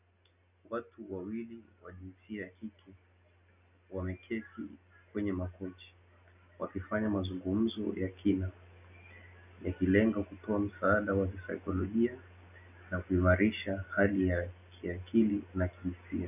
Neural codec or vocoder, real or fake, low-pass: none; real; 3.6 kHz